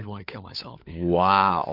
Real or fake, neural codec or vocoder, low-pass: fake; codec, 16 kHz, 4 kbps, FunCodec, trained on LibriTTS, 50 frames a second; 5.4 kHz